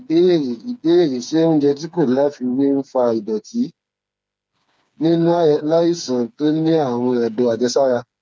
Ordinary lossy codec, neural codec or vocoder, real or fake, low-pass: none; codec, 16 kHz, 4 kbps, FreqCodec, smaller model; fake; none